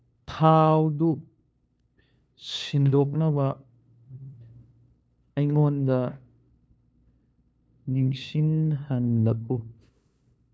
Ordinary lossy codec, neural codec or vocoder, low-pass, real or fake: none; codec, 16 kHz, 2 kbps, FunCodec, trained on LibriTTS, 25 frames a second; none; fake